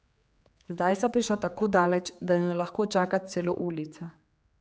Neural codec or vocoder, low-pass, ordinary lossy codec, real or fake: codec, 16 kHz, 4 kbps, X-Codec, HuBERT features, trained on general audio; none; none; fake